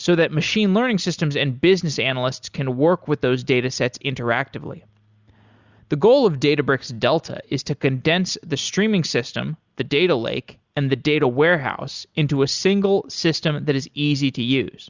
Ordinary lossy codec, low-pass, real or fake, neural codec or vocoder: Opus, 64 kbps; 7.2 kHz; real; none